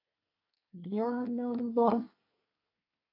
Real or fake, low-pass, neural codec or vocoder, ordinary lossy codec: fake; 5.4 kHz; codec, 24 kHz, 1 kbps, SNAC; AAC, 32 kbps